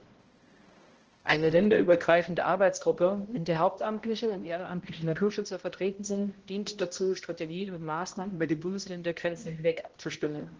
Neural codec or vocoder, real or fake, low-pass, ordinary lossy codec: codec, 16 kHz, 0.5 kbps, X-Codec, HuBERT features, trained on balanced general audio; fake; 7.2 kHz; Opus, 16 kbps